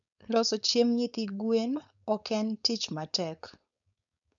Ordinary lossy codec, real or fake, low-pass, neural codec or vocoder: none; fake; 7.2 kHz; codec, 16 kHz, 4.8 kbps, FACodec